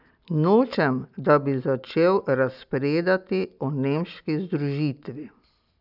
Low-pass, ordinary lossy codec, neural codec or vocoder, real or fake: 5.4 kHz; none; none; real